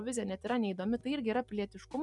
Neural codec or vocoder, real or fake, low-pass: none; real; 10.8 kHz